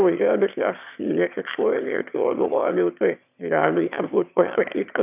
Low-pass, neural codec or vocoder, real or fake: 3.6 kHz; autoencoder, 22.05 kHz, a latent of 192 numbers a frame, VITS, trained on one speaker; fake